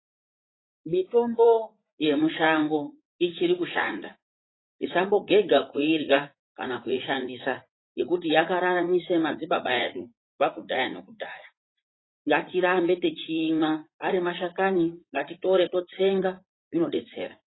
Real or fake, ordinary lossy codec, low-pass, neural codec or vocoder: fake; AAC, 16 kbps; 7.2 kHz; vocoder, 44.1 kHz, 80 mel bands, Vocos